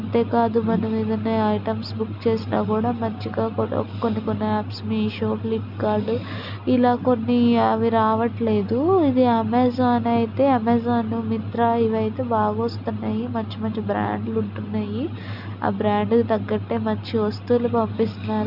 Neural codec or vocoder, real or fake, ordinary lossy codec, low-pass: none; real; none; 5.4 kHz